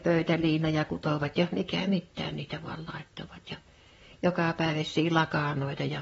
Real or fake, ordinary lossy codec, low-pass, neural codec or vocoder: fake; AAC, 24 kbps; 19.8 kHz; vocoder, 44.1 kHz, 128 mel bands, Pupu-Vocoder